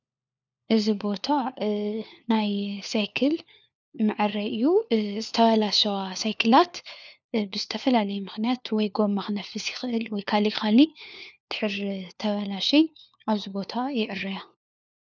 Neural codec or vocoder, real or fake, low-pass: codec, 16 kHz, 4 kbps, FunCodec, trained on LibriTTS, 50 frames a second; fake; 7.2 kHz